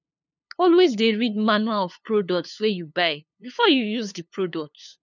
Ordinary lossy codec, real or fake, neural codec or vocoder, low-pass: none; fake; codec, 16 kHz, 2 kbps, FunCodec, trained on LibriTTS, 25 frames a second; 7.2 kHz